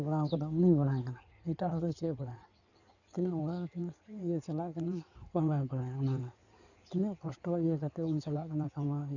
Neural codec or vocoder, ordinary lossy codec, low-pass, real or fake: codec, 16 kHz in and 24 kHz out, 2.2 kbps, FireRedTTS-2 codec; none; 7.2 kHz; fake